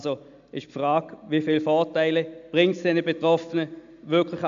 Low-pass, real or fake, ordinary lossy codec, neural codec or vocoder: 7.2 kHz; real; AAC, 64 kbps; none